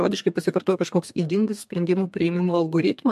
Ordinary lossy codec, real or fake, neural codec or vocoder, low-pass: MP3, 64 kbps; fake; codec, 32 kHz, 1.9 kbps, SNAC; 14.4 kHz